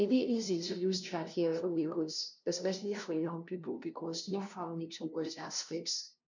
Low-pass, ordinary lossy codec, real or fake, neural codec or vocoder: 7.2 kHz; none; fake; codec, 16 kHz, 0.5 kbps, FunCodec, trained on LibriTTS, 25 frames a second